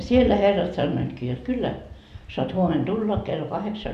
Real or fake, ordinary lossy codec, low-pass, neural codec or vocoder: real; none; 14.4 kHz; none